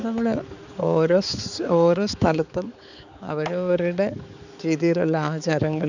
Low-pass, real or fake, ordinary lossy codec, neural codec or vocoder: 7.2 kHz; fake; none; codec, 16 kHz, 4 kbps, X-Codec, HuBERT features, trained on balanced general audio